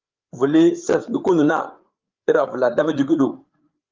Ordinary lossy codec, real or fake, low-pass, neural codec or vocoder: Opus, 32 kbps; fake; 7.2 kHz; codec, 16 kHz, 8 kbps, FreqCodec, larger model